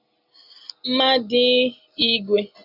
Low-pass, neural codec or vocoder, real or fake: 5.4 kHz; none; real